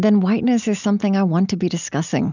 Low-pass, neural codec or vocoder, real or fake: 7.2 kHz; none; real